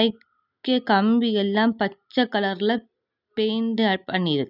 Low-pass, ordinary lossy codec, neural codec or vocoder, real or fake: 5.4 kHz; none; none; real